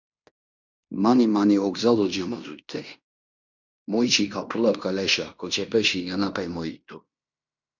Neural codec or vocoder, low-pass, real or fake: codec, 16 kHz in and 24 kHz out, 0.9 kbps, LongCat-Audio-Codec, fine tuned four codebook decoder; 7.2 kHz; fake